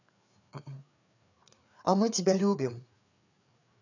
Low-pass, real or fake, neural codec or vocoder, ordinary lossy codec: 7.2 kHz; fake; codec, 16 kHz, 4 kbps, FreqCodec, larger model; none